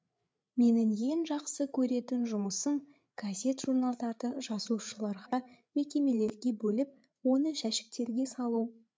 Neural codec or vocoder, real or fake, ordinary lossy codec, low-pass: codec, 16 kHz, 8 kbps, FreqCodec, larger model; fake; none; none